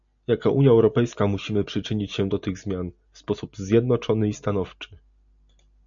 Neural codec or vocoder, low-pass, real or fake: none; 7.2 kHz; real